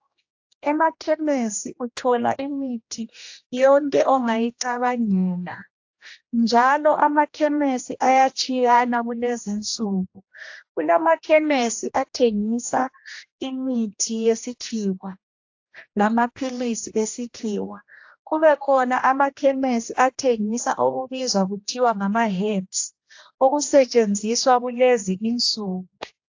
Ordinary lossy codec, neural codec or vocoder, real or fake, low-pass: AAC, 48 kbps; codec, 16 kHz, 1 kbps, X-Codec, HuBERT features, trained on general audio; fake; 7.2 kHz